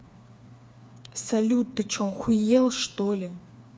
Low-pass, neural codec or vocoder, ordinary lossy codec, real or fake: none; codec, 16 kHz, 4 kbps, FreqCodec, smaller model; none; fake